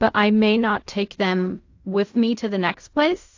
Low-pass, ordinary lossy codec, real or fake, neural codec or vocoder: 7.2 kHz; AAC, 48 kbps; fake; codec, 16 kHz in and 24 kHz out, 0.4 kbps, LongCat-Audio-Codec, fine tuned four codebook decoder